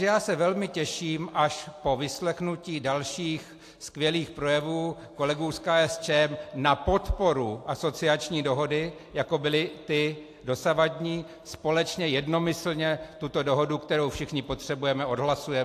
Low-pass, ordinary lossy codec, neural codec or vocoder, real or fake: 14.4 kHz; AAC, 64 kbps; none; real